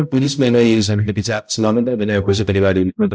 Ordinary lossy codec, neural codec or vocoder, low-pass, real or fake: none; codec, 16 kHz, 0.5 kbps, X-Codec, HuBERT features, trained on balanced general audio; none; fake